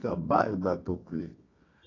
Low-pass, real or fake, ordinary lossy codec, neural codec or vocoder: 7.2 kHz; fake; AAC, 32 kbps; codec, 24 kHz, 0.9 kbps, WavTokenizer, medium music audio release